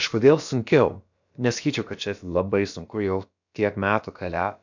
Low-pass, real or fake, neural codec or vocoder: 7.2 kHz; fake; codec, 16 kHz, about 1 kbps, DyCAST, with the encoder's durations